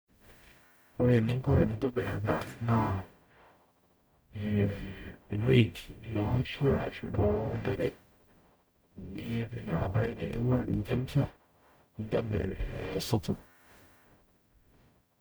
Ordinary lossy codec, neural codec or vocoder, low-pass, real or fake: none; codec, 44.1 kHz, 0.9 kbps, DAC; none; fake